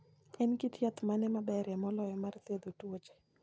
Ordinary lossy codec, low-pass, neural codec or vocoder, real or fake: none; none; none; real